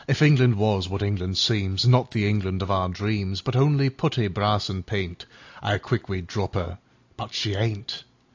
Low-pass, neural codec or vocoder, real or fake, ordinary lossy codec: 7.2 kHz; none; real; AAC, 48 kbps